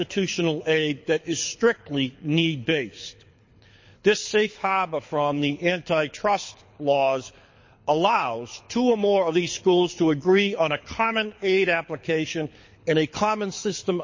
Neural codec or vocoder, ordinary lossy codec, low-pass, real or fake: codec, 24 kHz, 6 kbps, HILCodec; MP3, 32 kbps; 7.2 kHz; fake